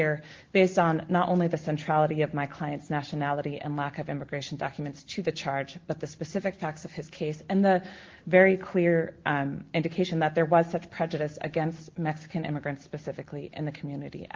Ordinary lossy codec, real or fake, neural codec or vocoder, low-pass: Opus, 16 kbps; real; none; 7.2 kHz